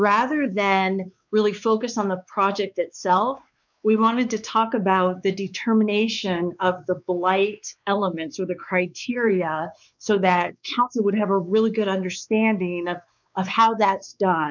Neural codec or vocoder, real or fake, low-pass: codec, 16 kHz, 4 kbps, X-Codec, WavLM features, trained on Multilingual LibriSpeech; fake; 7.2 kHz